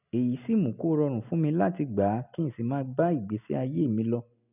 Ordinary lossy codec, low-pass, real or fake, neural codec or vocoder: none; 3.6 kHz; real; none